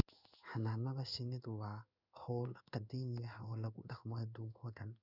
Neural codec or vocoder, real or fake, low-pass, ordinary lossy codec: codec, 16 kHz in and 24 kHz out, 1 kbps, XY-Tokenizer; fake; 5.4 kHz; none